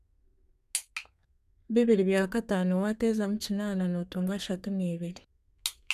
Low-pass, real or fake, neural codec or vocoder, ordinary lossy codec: 14.4 kHz; fake; codec, 32 kHz, 1.9 kbps, SNAC; none